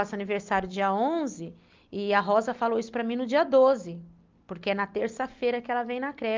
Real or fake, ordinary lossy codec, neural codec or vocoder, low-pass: real; Opus, 24 kbps; none; 7.2 kHz